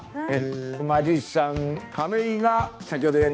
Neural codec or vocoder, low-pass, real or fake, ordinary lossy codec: codec, 16 kHz, 2 kbps, X-Codec, HuBERT features, trained on balanced general audio; none; fake; none